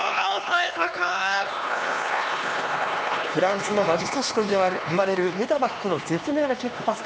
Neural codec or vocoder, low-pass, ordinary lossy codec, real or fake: codec, 16 kHz, 2 kbps, X-Codec, HuBERT features, trained on LibriSpeech; none; none; fake